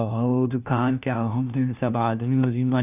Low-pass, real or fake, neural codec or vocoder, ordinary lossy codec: 3.6 kHz; fake; codec, 16 kHz, 1 kbps, FunCodec, trained on LibriTTS, 50 frames a second; none